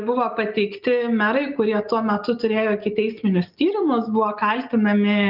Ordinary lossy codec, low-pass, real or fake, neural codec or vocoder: Opus, 24 kbps; 5.4 kHz; real; none